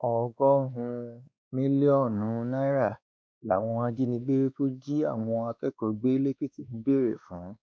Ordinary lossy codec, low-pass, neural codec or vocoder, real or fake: none; none; codec, 16 kHz, 2 kbps, X-Codec, WavLM features, trained on Multilingual LibriSpeech; fake